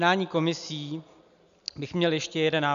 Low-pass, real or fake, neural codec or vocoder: 7.2 kHz; real; none